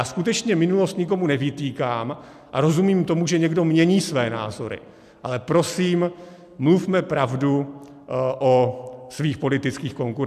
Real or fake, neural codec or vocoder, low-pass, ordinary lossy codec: real; none; 14.4 kHz; AAC, 96 kbps